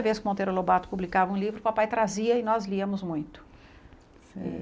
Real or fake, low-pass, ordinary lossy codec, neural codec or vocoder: real; none; none; none